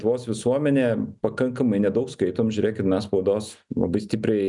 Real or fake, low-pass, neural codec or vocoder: real; 10.8 kHz; none